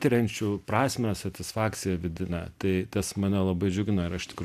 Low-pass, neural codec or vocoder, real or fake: 14.4 kHz; none; real